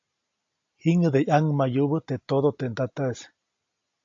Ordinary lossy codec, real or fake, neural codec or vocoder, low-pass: AAC, 48 kbps; real; none; 7.2 kHz